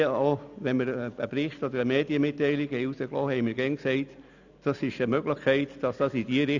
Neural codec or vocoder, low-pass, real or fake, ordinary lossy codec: none; 7.2 kHz; real; none